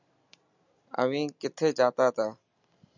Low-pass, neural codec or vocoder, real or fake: 7.2 kHz; none; real